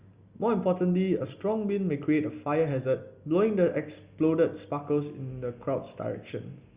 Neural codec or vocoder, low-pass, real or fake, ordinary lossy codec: none; 3.6 kHz; real; Opus, 64 kbps